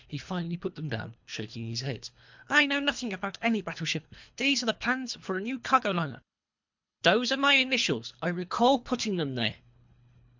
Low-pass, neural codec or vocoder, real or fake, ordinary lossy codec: 7.2 kHz; codec, 24 kHz, 3 kbps, HILCodec; fake; MP3, 64 kbps